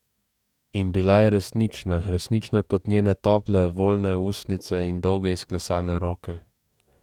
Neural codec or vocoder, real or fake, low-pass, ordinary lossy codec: codec, 44.1 kHz, 2.6 kbps, DAC; fake; 19.8 kHz; none